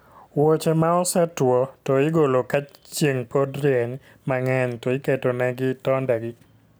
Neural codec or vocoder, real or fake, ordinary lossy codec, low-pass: none; real; none; none